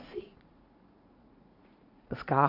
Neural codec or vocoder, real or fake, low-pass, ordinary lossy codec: vocoder, 44.1 kHz, 80 mel bands, Vocos; fake; 5.4 kHz; none